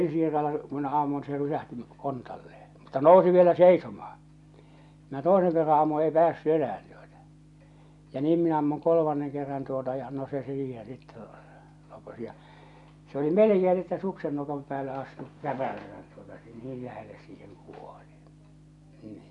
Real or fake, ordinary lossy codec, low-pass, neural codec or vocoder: real; none; 10.8 kHz; none